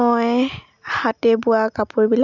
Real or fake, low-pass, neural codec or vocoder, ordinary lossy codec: real; 7.2 kHz; none; none